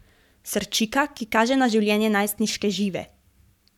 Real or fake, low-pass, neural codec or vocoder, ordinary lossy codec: fake; 19.8 kHz; codec, 44.1 kHz, 7.8 kbps, Pupu-Codec; none